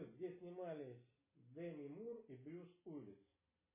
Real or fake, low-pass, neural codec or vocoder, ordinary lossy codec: real; 3.6 kHz; none; MP3, 16 kbps